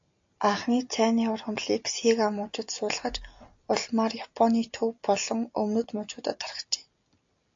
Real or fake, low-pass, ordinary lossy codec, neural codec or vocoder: real; 7.2 kHz; AAC, 48 kbps; none